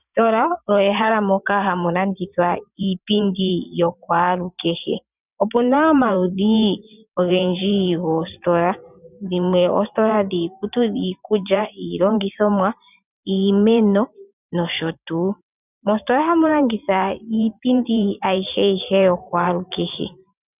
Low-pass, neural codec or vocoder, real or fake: 3.6 kHz; vocoder, 44.1 kHz, 128 mel bands every 512 samples, BigVGAN v2; fake